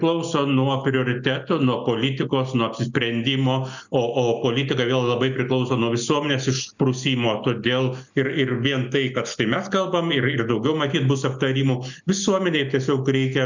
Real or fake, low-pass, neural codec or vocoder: real; 7.2 kHz; none